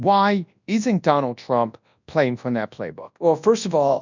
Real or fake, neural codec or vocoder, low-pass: fake; codec, 24 kHz, 0.9 kbps, WavTokenizer, large speech release; 7.2 kHz